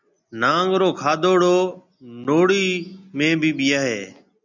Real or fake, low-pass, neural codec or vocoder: real; 7.2 kHz; none